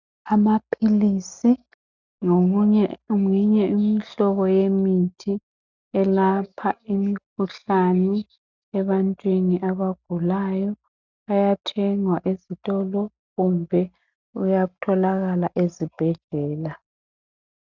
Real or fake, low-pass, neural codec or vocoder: real; 7.2 kHz; none